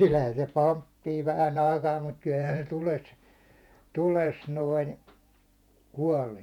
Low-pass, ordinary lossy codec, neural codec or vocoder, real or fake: 19.8 kHz; none; vocoder, 48 kHz, 128 mel bands, Vocos; fake